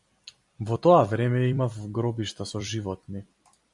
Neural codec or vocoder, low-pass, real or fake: vocoder, 44.1 kHz, 128 mel bands every 256 samples, BigVGAN v2; 10.8 kHz; fake